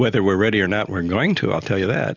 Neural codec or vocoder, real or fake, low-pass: none; real; 7.2 kHz